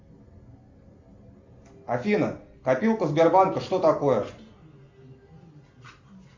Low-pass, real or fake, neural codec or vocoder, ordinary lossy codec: 7.2 kHz; real; none; MP3, 64 kbps